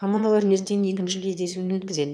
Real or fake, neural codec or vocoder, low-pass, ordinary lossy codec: fake; autoencoder, 22.05 kHz, a latent of 192 numbers a frame, VITS, trained on one speaker; none; none